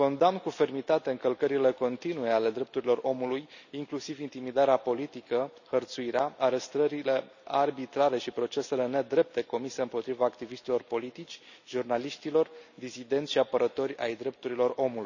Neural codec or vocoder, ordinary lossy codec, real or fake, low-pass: none; none; real; 7.2 kHz